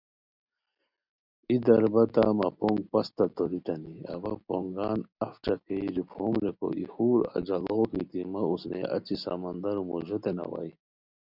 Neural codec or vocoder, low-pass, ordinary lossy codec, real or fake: none; 5.4 kHz; Opus, 64 kbps; real